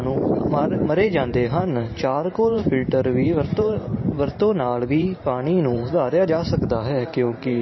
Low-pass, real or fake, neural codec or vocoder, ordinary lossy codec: 7.2 kHz; fake; vocoder, 44.1 kHz, 128 mel bands every 512 samples, BigVGAN v2; MP3, 24 kbps